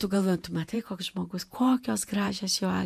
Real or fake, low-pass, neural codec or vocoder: fake; 14.4 kHz; vocoder, 48 kHz, 128 mel bands, Vocos